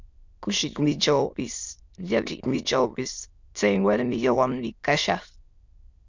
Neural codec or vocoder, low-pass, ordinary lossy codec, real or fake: autoencoder, 22.05 kHz, a latent of 192 numbers a frame, VITS, trained on many speakers; 7.2 kHz; Opus, 64 kbps; fake